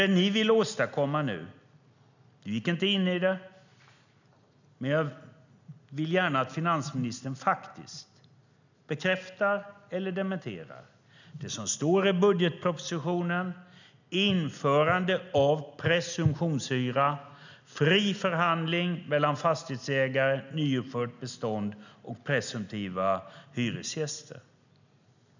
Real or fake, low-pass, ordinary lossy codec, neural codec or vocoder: real; 7.2 kHz; none; none